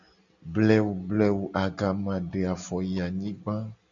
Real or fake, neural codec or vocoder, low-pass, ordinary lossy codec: real; none; 7.2 kHz; MP3, 96 kbps